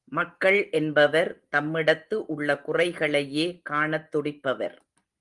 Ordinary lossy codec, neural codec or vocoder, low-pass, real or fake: Opus, 24 kbps; none; 10.8 kHz; real